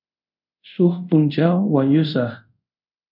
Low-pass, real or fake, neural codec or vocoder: 5.4 kHz; fake; codec, 24 kHz, 0.5 kbps, DualCodec